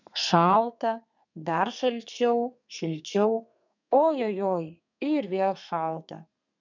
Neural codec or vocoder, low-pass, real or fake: codec, 32 kHz, 1.9 kbps, SNAC; 7.2 kHz; fake